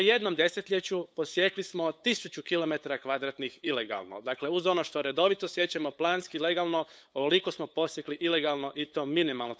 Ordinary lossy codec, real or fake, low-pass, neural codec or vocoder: none; fake; none; codec, 16 kHz, 8 kbps, FunCodec, trained on LibriTTS, 25 frames a second